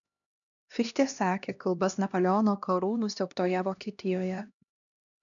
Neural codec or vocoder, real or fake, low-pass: codec, 16 kHz, 1 kbps, X-Codec, HuBERT features, trained on LibriSpeech; fake; 7.2 kHz